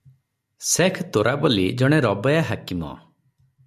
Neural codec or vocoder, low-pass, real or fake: none; 14.4 kHz; real